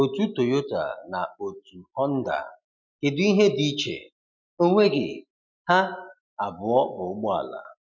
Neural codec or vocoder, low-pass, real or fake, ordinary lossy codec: none; 7.2 kHz; real; none